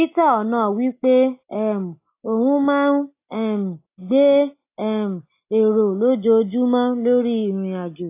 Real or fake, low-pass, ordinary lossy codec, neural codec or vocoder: real; 3.6 kHz; AAC, 24 kbps; none